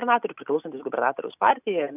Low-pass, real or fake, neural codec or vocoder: 3.6 kHz; real; none